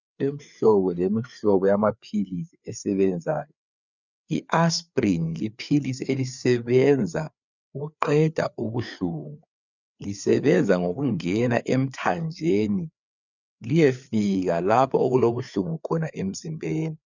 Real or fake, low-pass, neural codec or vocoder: fake; 7.2 kHz; codec, 16 kHz, 4 kbps, FreqCodec, larger model